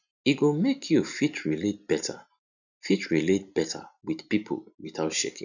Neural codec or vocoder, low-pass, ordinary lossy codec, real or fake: none; 7.2 kHz; none; real